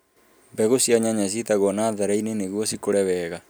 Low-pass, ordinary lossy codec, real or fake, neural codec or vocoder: none; none; real; none